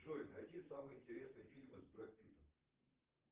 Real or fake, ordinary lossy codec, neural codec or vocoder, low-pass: fake; Opus, 16 kbps; vocoder, 44.1 kHz, 128 mel bands, Pupu-Vocoder; 3.6 kHz